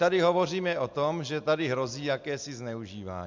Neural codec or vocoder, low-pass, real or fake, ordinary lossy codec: none; 7.2 kHz; real; MP3, 64 kbps